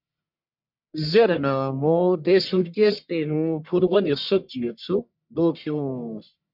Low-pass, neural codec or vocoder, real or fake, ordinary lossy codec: 5.4 kHz; codec, 44.1 kHz, 1.7 kbps, Pupu-Codec; fake; MP3, 48 kbps